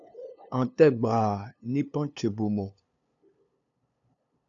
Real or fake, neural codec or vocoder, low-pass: fake; codec, 16 kHz, 2 kbps, FunCodec, trained on LibriTTS, 25 frames a second; 7.2 kHz